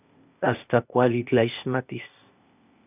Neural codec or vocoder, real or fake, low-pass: codec, 16 kHz, 0.8 kbps, ZipCodec; fake; 3.6 kHz